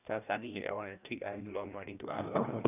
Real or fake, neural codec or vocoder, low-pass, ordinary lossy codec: fake; codec, 16 kHz, 1 kbps, FreqCodec, larger model; 3.6 kHz; none